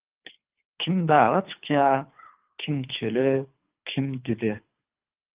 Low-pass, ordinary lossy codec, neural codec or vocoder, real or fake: 3.6 kHz; Opus, 24 kbps; codec, 24 kHz, 3 kbps, HILCodec; fake